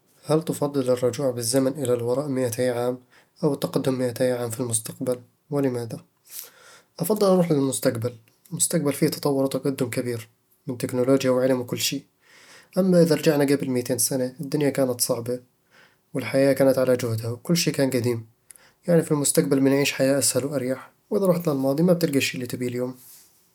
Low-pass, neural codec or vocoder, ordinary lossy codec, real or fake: 19.8 kHz; none; none; real